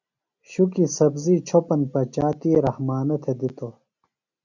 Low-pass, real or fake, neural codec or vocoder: 7.2 kHz; real; none